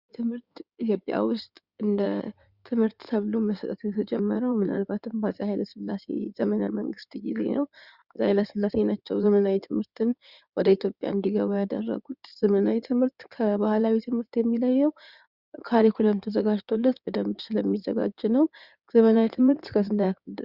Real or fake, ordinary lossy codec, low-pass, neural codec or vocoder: fake; Opus, 64 kbps; 5.4 kHz; codec, 16 kHz in and 24 kHz out, 2.2 kbps, FireRedTTS-2 codec